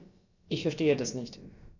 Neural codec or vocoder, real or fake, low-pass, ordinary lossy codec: codec, 16 kHz, about 1 kbps, DyCAST, with the encoder's durations; fake; 7.2 kHz; none